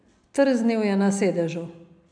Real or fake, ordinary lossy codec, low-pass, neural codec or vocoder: real; none; 9.9 kHz; none